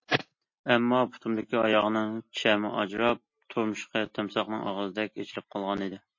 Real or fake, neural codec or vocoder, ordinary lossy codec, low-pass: real; none; MP3, 32 kbps; 7.2 kHz